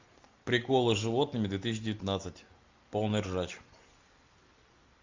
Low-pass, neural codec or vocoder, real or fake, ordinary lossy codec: 7.2 kHz; none; real; MP3, 64 kbps